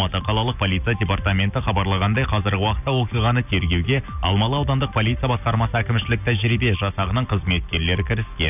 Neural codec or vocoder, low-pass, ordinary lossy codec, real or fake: none; 3.6 kHz; none; real